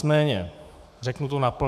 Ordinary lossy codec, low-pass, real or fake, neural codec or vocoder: MP3, 96 kbps; 14.4 kHz; fake; autoencoder, 48 kHz, 128 numbers a frame, DAC-VAE, trained on Japanese speech